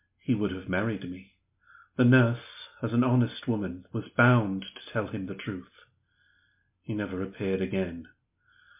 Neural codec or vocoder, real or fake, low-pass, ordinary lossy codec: none; real; 3.6 kHz; MP3, 32 kbps